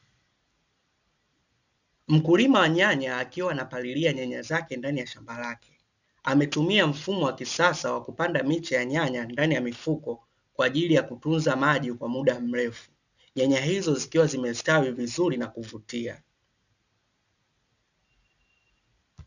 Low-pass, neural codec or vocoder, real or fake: 7.2 kHz; none; real